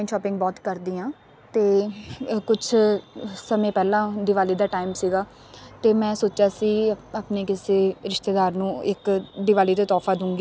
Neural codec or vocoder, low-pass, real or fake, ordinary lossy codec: none; none; real; none